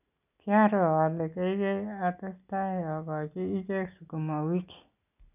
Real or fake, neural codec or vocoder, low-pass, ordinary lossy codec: real; none; 3.6 kHz; none